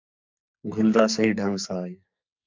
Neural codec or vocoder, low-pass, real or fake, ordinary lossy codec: codec, 44.1 kHz, 2.6 kbps, SNAC; 7.2 kHz; fake; MP3, 64 kbps